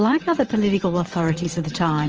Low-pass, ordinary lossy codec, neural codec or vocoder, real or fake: 7.2 kHz; Opus, 24 kbps; vocoder, 22.05 kHz, 80 mel bands, WaveNeXt; fake